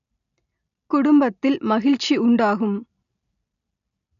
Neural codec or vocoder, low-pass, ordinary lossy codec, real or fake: none; 7.2 kHz; none; real